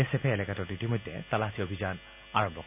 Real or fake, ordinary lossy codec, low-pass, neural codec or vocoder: real; none; 3.6 kHz; none